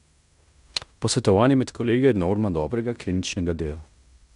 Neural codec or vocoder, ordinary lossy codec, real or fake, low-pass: codec, 16 kHz in and 24 kHz out, 0.9 kbps, LongCat-Audio-Codec, fine tuned four codebook decoder; none; fake; 10.8 kHz